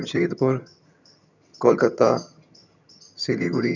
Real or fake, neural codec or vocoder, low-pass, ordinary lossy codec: fake; vocoder, 22.05 kHz, 80 mel bands, HiFi-GAN; 7.2 kHz; none